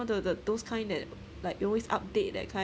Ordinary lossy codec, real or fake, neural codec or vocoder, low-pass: none; real; none; none